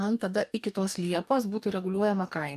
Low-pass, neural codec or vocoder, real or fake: 14.4 kHz; codec, 44.1 kHz, 2.6 kbps, DAC; fake